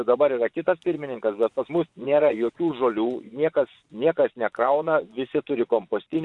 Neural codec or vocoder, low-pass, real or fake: vocoder, 24 kHz, 100 mel bands, Vocos; 10.8 kHz; fake